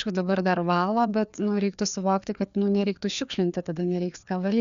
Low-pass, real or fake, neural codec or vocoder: 7.2 kHz; fake; codec, 16 kHz, 2 kbps, FreqCodec, larger model